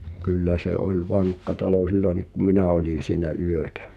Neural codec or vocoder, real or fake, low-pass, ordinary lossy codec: codec, 44.1 kHz, 2.6 kbps, SNAC; fake; 14.4 kHz; none